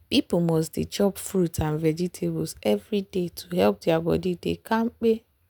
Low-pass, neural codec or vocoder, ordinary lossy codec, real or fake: none; none; none; real